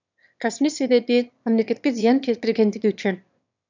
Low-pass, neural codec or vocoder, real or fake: 7.2 kHz; autoencoder, 22.05 kHz, a latent of 192 numbers a frame, VITS, trained on one speaker; fake